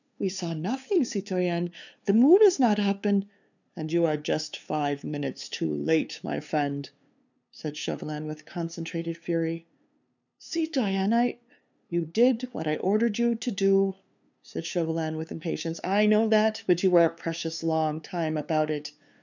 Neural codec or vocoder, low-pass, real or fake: codec, 16 kHz, 2 kbps, FunCodec, trained on LibriTTS, 25 frames a second; 7.2 kHz; fake